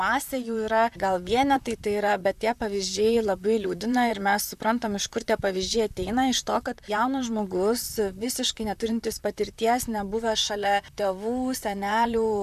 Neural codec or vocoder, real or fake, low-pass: vocoder, 44.1 kHz, 128 mel bands, Pupu-Vocoder; fake; 14.4 kHz